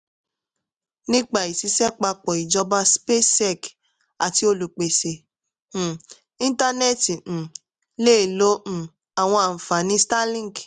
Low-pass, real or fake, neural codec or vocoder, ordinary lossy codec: 9.9 kHz; real; none; none